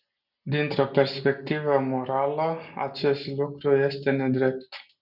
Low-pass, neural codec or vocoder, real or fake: 5.4 kHz; none; real